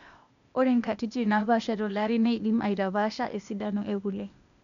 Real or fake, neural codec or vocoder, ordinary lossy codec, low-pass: fake; codec, 16 kHz, 0.8 kbps, ZipCodec; MP3, 96 kbps; 7.2 kHz